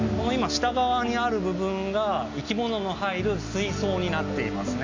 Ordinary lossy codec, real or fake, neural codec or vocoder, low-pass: MP3, 64 kbps; real; none; 7.2 kHz